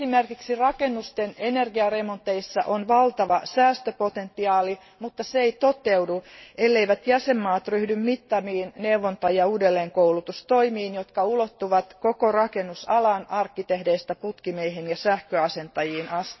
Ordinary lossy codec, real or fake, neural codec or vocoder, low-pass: MP3, 24 kbps; real; none; 7.2 kHz